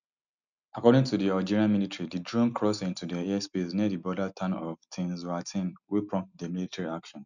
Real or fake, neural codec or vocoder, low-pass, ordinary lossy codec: real; none; 7.2 kHz; none